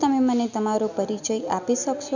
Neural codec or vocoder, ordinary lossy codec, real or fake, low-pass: none; none; real; 7.2 kHz